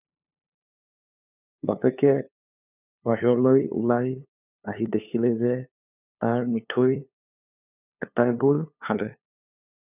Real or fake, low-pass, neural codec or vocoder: fake; 3.6 kHz; codec, 16 kHz, 2 kbps, FunCodec, trained on LibriTTS, 25 frames a second